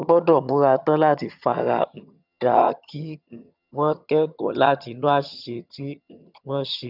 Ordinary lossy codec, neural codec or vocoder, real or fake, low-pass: none; vocoder, 22.05 kHz, 80 mel bands, HiFi-GAN; fake; 5.4 kHz